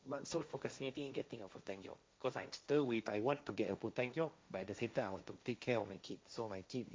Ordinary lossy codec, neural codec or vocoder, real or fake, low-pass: none; codec, 16 kHz, 1.1 kbps, Voila-Tokenizer; fake; none